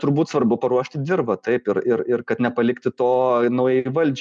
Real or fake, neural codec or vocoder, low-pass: real; none; 9.9 kHz